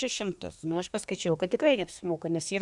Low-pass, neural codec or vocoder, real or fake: 10.8 kHz; codec, 24 kHz, 1 kbps, SNAC; fake